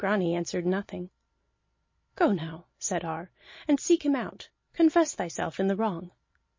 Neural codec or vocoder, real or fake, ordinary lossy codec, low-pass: none; real; MP3, 32 kbps; 7.2 kHz